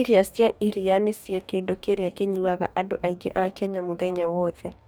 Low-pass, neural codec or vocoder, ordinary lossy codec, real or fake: none; codec, 44.1 kHz, 2.6 kbps, DAC; none; fake